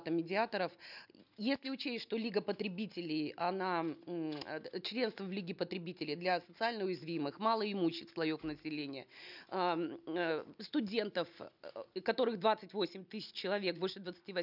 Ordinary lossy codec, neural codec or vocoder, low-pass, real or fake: none; none; 5.4 kHz; real